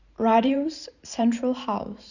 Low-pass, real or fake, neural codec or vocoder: 7.2 kHz; fake; vocoder, 44.1 kHz, 128 mel bands every 512 samples, BigVGAN v2